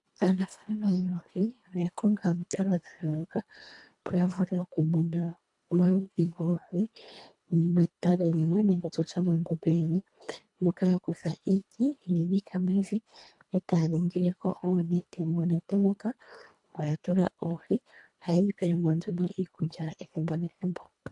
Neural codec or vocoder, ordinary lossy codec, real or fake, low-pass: codec, 24 kHz, 1.5 kbps, HILCodec; AAC, 64 kbps; fake; 10.8 kHz